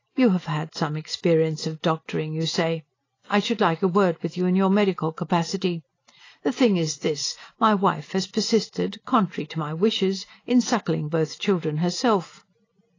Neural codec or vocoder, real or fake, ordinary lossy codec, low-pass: none; real; AAC, 32 kbps; 7.2 kHz